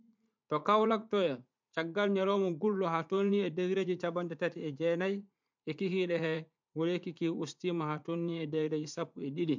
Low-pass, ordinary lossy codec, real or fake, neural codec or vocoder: 7.2 kHz; MP3, 64 kbps; fake; vocoder, 44.1 kHz, 80 mel bands, Vocos